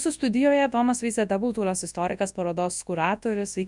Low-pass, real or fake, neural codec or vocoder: 10.8 kHz; fake; codec, 24 kHz, 0.9 kbps, WavTokenizer, large speech release